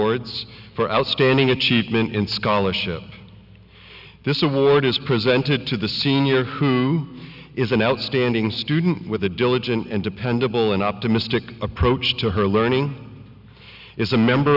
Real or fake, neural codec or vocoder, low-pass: real; none; 5.4 kHz